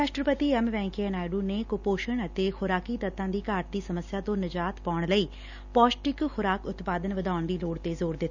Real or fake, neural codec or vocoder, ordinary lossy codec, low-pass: real; none; none; 7.2 kHz